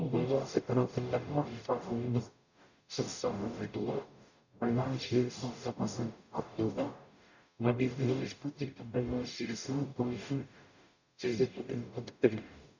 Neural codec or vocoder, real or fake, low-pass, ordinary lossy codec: codec, 44.1 kHz, 0.9 kbps, DAC; fake; 7.2 kHz; none